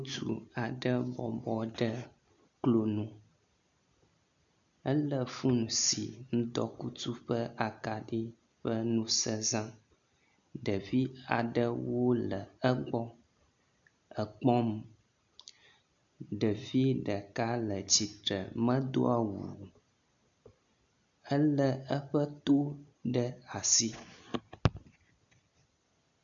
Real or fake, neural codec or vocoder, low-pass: real; none; 7.2 kHz